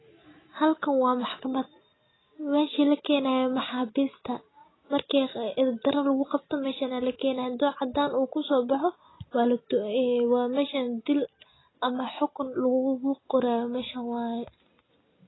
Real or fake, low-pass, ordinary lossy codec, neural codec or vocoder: real; 7.2 kHz; AAC, 16 kbps; none